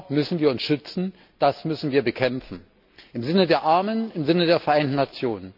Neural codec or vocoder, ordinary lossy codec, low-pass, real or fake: none; none; 5.4 kHz; real